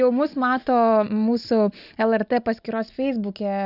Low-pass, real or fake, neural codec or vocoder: 5.4 kHz; real; none